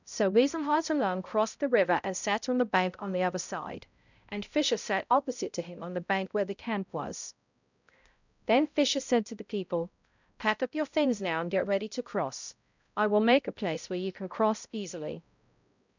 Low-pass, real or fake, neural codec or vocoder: 7.2 kHz; fake; codec, 16 kHz, 0.5 kbps, X-Codec, HuBERT features, trained on balanced general audio